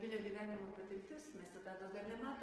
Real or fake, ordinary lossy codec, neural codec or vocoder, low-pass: real; Opus, 16 kbps; none; 10.8 kHz